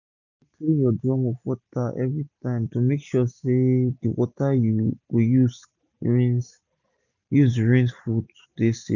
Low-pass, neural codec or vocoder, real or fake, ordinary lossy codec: 7.2 kHz; none; real; none